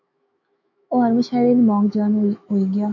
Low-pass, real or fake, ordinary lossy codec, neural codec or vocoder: 7.2 kHz; fake; AAC, 48 kbps; autoencoder, 48 kHz, 128 numbers a frame, DAC-VAE, trained on Japanese speech